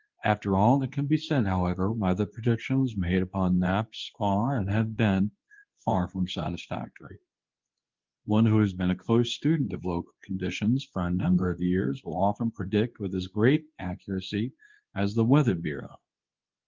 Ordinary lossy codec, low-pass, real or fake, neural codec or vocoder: Opus, 32 kbps; 7.2 kHz; fake; codec, 24 kHz, 0.9 kbps, WavTokenizer, medium speech release version 2